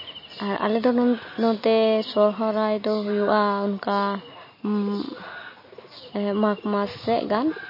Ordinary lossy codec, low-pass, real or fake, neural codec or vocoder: MP3, 24 kbps; 5.4 kHz; real; none